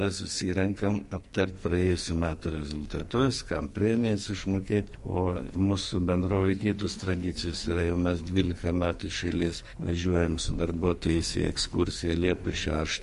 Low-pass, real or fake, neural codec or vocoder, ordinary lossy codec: 14.4 kHz; fake; codec, 44.1 kHz, 2.6 kbps, SNAC; MP3, 48 kbps